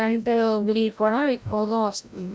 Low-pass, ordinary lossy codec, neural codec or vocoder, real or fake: none; none; codec, 16 kHz, 0.5 kbps, FreqCodec, larger model; fake